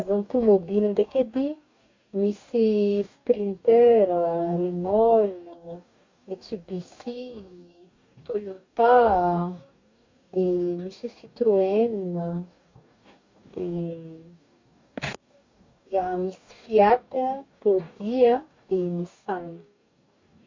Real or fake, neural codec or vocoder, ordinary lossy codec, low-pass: fake; codec, 44.1 kHz, 2.6 kbps, DAC; MP3, 48 kbps; 7.2 kHz